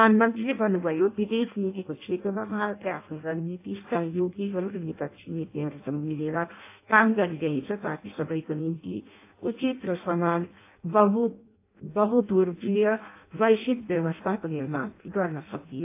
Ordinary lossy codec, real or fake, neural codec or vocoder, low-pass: AAC, 24 kbps; fake; codec, 16 kHz in and 24 kHz out, 0.6 kbps, FireRedTTS-2 codec; 3.6 kHz